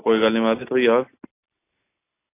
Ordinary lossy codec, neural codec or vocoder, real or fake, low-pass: AAC, 24 kbps; none; real; 3.6 kHz